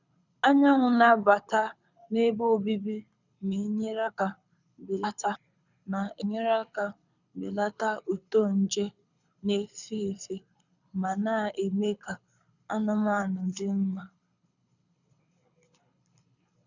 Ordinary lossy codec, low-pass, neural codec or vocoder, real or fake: none; 7.2 kHz; codec, 24 kHz, 6 kbps, HILCodec; fake